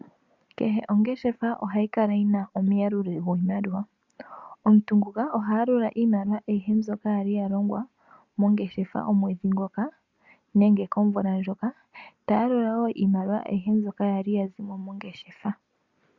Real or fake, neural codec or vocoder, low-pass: real; none; 7.2 kHz